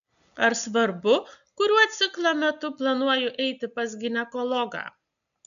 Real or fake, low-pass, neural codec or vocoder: real; 7.2 kHz; none